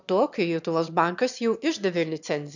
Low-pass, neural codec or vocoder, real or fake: 7.2 kHz; autoencoder, 22.05 kHz, a latent of 192 numbers a frame, VITS, trained on one speaker; fake